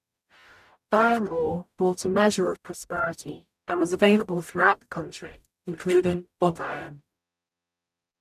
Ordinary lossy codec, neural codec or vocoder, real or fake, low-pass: none; codec, 44.1 kHz, 0.9 kbps, DAC; fake; 14.4 kHz